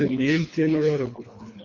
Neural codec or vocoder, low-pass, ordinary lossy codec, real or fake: codec, 24 kHz, 3 kbps, HILCodec; 7.2 kHz; MP3, 48 kbps; fake